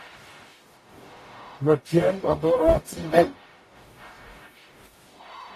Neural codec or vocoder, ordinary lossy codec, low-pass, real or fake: codec, 44.1 kHz, 0.9 kbps, DAC; AAC, 48 kbps; 14.4 kHz; fake